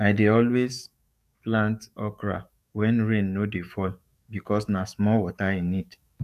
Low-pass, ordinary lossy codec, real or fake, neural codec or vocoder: 14.4 kHz; none; fake; codec, 44.1 kHz, 7.8 kbps, DAC